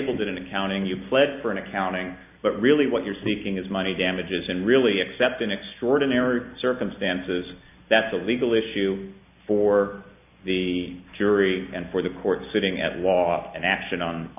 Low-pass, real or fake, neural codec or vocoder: 3.6 kHz; real; none